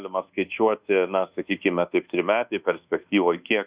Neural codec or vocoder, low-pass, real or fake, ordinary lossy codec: codec, 24 kHz, 1.2 kbps, DualCodec; 3.6 kHz; fake; Opus, 64 kbps